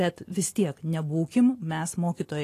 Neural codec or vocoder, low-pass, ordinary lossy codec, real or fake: none; 14.4 kHz; AAC, 48 kbps; real